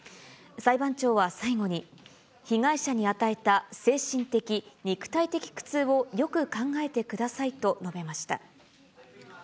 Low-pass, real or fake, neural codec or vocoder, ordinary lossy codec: none; real; none; none